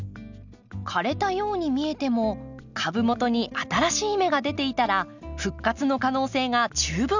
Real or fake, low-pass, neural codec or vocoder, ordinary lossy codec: real; 7.2 kHz; none; none